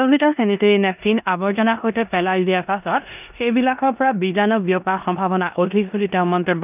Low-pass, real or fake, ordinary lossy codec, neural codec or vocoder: 3.6 kHz; fake; none; codec, 16 kHz in and 24 kHz out, 0.9 kbps, LongCat-Audio-Codec, four codebook decoder